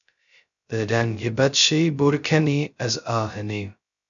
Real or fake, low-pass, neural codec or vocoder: fake; 7.2 kHz; codec, 16 kHz, 0.2 kbps, FocalCodec